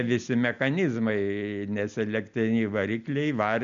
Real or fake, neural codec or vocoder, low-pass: real; none; 7.2 kHz